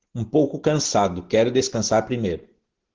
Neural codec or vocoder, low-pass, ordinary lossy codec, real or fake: none; 7.2 kHz; Opus, 16 kbps; real